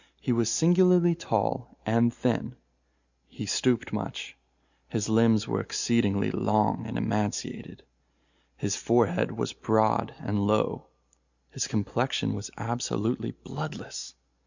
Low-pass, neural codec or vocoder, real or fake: 7.2 kHz; none; real